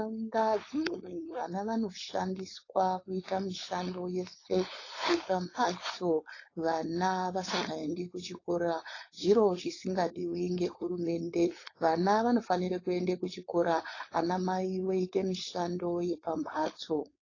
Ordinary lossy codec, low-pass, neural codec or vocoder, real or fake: AAC, 32 kbps; 7.2 kHz; codec, 16 kHz, 4.8 kbps, FACodec; fake